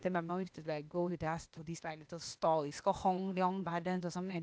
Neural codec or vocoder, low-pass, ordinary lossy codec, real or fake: codec, 16 kHz, 0.8 kbps, ZipCodec; none; none; fake